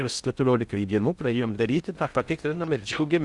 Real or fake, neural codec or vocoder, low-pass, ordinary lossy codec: fake; codec, 16 kHz in and 24 kHz out, 0.6 kbps, FocalCodec, streaming, 2048 codes; 10.8 kHz; Opus, 64 kbps